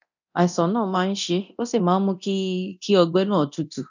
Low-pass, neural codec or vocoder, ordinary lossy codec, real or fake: 7.2 kHz; codec, 24 kHz, 0.9 kbps, DualCodec; none; fake